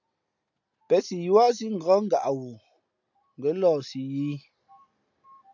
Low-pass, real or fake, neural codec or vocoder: 7.2 kHz; real; none